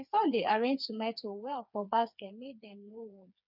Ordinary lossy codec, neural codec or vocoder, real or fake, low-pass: none; codec, 16 kHz, 4 kbps, FreqCodec, smaller model; fake; 5.4 kHz